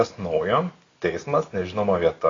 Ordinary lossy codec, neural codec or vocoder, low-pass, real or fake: AAC, 32 kbps; none; 7.2 kHz; real